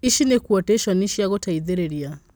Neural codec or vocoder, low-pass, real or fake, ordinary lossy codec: none; none; real; none